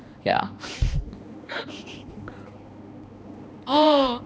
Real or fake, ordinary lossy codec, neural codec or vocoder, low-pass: fake; none; codec, 16 kHz, 2 kbps, X-Codec, HuBERT features, trained on balanced general audio; none